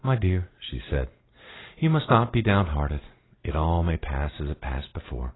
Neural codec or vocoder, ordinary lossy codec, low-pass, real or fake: none; AAC, 16 kbps; 7.2 kHz; real